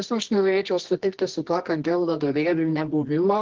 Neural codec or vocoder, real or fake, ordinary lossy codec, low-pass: codec, 24 kHz, 0.9 kbps, WavTokenizer, medium music audio release; fake; Opus, 16 kbps; 7.2 kHz